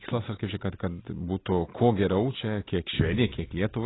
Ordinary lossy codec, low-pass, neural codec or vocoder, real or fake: AAC, 16 kbps; 7.2 kHz; none; real